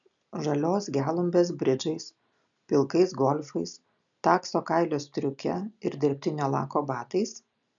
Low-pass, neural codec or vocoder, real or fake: 7.2 kHz; none; real